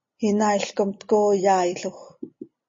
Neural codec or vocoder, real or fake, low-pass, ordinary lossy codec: none; real; 7.2 kHz; MP3, 32 kbps